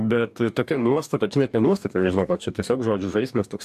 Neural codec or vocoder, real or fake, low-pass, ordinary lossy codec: codec, 44.1 kHz, 2.6 kbps, DAC; fake; 14.4 kHz; AAC, 96 kbps